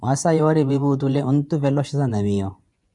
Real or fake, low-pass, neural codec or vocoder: fake; 10.8 kHz; vocoder, 24 kHz, 100 mel bands, Vocos